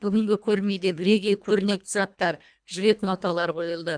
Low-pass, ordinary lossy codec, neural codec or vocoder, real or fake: 9.9 kHz; none; codec, 24 kHz, 1.5 kbps, HILCodec; fake